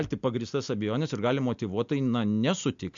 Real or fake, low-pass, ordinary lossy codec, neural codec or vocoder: real; 7.2 kHz; MP3, 64 kbps; none